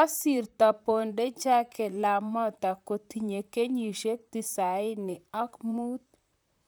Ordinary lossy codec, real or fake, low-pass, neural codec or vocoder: none; fake; none; vocoder, 44.1 kHz, 128 mel bands, Pupu-Vocoder